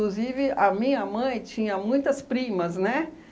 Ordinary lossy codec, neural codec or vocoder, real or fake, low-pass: none; none; real; none